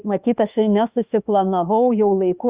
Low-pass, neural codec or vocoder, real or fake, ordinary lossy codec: 3.6 kHz; autoencoder, 48 kHz, 32 numbers a frame, DAC-VAE, trained on Japanese speech; fake; Opus, 64 kbps